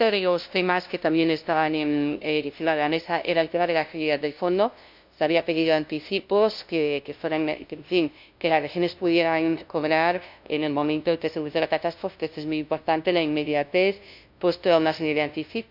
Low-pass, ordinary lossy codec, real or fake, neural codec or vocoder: 5.4 kHz; MP3, 48 kbps; fake; codec, 16 kHz, 0.5 kbps, FunCodec, trained on LibriTTS, 25 frames a second